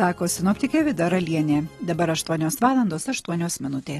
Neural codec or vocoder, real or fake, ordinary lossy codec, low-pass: none; real; AAC, 32 kbps; 19.8 kHz